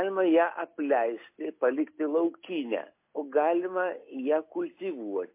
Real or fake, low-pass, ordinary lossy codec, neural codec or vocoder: real; 3.6 kHz; MP3, 24 kbps; none